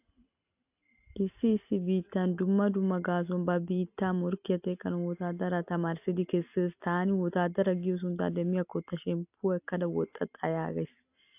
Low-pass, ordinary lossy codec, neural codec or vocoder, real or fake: 3.6 kHz; AAC, 32 kbps; none; real